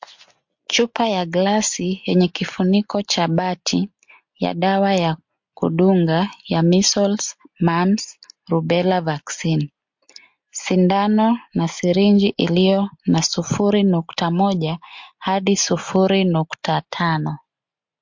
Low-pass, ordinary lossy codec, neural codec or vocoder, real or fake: 7.2 kHz; MP3, 48 kbps; none; real